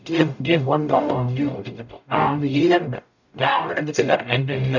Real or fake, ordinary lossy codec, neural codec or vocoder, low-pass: fake; none; codec, 44.1 kHz, 0.9 kbps, DAC; 7.2 kHz